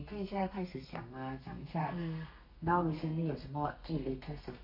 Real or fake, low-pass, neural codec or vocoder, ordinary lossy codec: fake; 5.4 kHz; codec, 32 kHz, 1.9 kbps, SNAC; MP3, 32 kbps